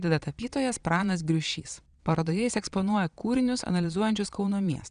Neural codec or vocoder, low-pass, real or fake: vocoder, 22.05 kHz, 80 mel bands, Vocos; 9.9 kHz; fake